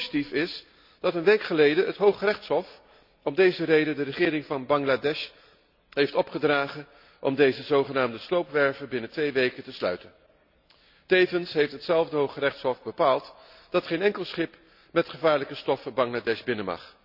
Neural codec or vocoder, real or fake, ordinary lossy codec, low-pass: none; real; none; 5.4 kHz